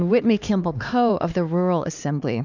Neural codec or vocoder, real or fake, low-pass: codec, 16 kHz, 2 kbps, X-Codec, HuBERT features, trained on LibriSpeech; fake; 7.2 kHz